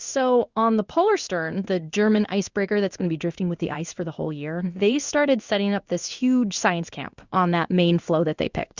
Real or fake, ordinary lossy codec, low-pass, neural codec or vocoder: fake; Opus, 64 kbps; 7.2 kHz; codec, 16 kHz in and 24 kHz out, 1 kbps, XY-Tokenizer